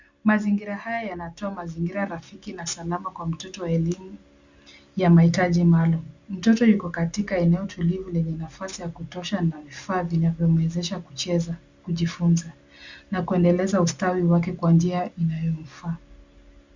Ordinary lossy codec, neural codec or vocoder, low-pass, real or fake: Opus, 64 kbps; none; 7.2 kHz; real